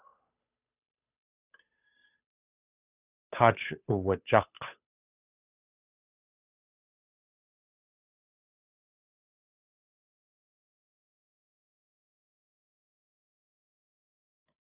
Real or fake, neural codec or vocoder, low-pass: fake; codec, 16 kHz, 8 kbps, FunCodec, trained on Chinese and English, 25 frames a second; 3.6 kHz